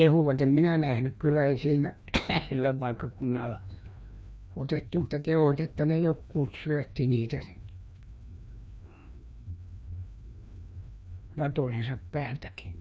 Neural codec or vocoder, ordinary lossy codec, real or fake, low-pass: codec, 16 kHz, 1 kbps, FreqCodec, larger model; none; fake; none